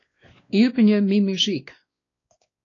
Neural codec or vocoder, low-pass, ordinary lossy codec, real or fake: codec, 16 kHz, 2 kbps, X-Codec, WavLM features, trained on Multilingual LibriSpeech; 7.2 kHz; AAC, 32 kbps; fake